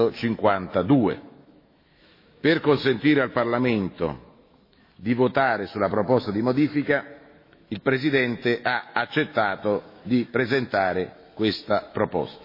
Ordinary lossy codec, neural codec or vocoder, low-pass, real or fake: MP3, 24 kbps; autoencoder, 48 kHz, 128 numbers a frame, DAC-VAE, trained on Japanese speech; 5.4 kHz; fake